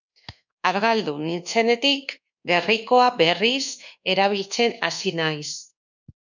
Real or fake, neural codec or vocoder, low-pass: fake; codec, 24 kHz, 1.2 kbps, DualCodec; 7.2 kHz